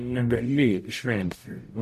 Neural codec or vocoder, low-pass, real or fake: codec, 44.1 kHz, 0.9 kbps, DAC; 14.4 kHz; fake